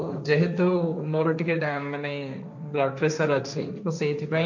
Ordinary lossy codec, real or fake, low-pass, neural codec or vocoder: none; fake; 7.2 kHz; codec, 16 kHz, 1.1 kbps, Voila-Tokenizer